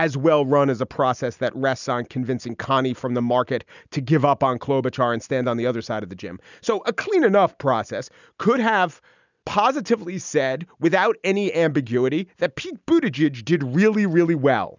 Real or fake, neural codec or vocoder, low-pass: real; none; 7.2 kHz